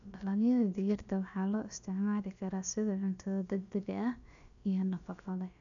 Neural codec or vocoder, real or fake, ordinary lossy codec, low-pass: codec, 16 kHz, about 1 kbps, DyCAST, with the encoder's durations; fake; none; 7.2 kHz